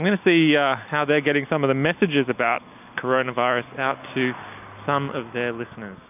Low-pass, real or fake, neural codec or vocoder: 3.6 kHz; real; none